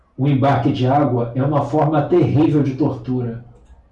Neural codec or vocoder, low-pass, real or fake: none; 10.8 kHz; real